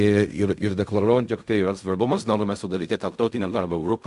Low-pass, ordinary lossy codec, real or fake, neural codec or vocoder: 10.8 kHz; Opus, 64 kbps; fake; codec, 16 kHz in and 24 kHz out, 0.4 kbps, LongCat-Audio-Codec, fine tuned four codebook decoder